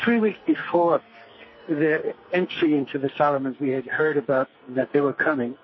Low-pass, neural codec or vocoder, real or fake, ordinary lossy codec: 7.2 kHz; codec, 44.1 kHz, 2.6 kbps, SNAC; fake; MP3, 24 kbps